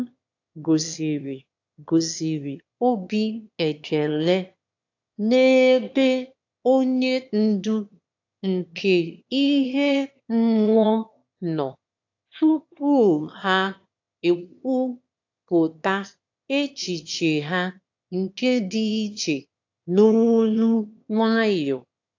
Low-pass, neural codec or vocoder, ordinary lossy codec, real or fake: 7.2 kHz; autoencoder, 22.05 kHz, a latent of 192 numbers a frame, VITS, trained on one speaker; AAC, 48 kbps; fake